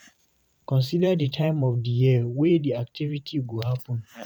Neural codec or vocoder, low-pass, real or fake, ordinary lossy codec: vocoder, 44.1 kHz, 128 mel bands every 512 samples, BigVGAN v2; 19.8 kHz; fake; none